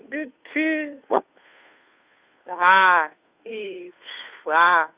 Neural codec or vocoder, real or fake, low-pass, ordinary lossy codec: codec, 16 kHz, 2 kbps, FunCodec, trained on Chinese and English, 25 frames a second; fake; 3.6 kHz; Opus, 64 kbps